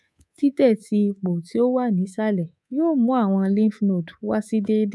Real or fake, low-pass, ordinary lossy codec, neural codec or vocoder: fake; none; none; codec, 24 kHz, 3.1 kbps, DualCodec